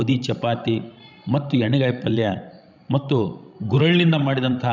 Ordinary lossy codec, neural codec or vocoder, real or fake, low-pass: none; codec, 16 kHz, 16 kbps, FreqCodec, larger model; fake; 7.2 kHz